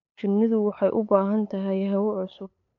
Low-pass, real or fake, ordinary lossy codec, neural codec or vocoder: 7.2 kHz; fake; none; codec, 16 kHz, 8 kbps, FunCodec, trained on LibriTTS, 25 frames a second